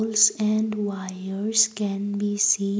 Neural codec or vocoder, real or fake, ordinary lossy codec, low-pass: none; real; none; none